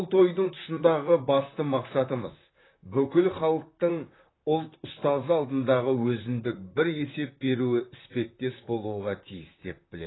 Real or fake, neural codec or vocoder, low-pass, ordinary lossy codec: fake; vocoder, 44.1 kHz, 128 mel bands, Pupu-Vocoder; 7.2 kHz; AAC, 16 kbps